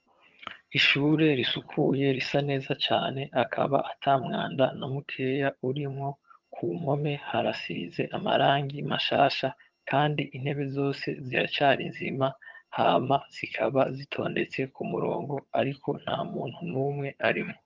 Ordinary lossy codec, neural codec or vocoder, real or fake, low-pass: Opus, 32 kbps; vocoder, 22.05 kHz, 80 mel bands, HiFi-GAN; fake; 7.2 kHz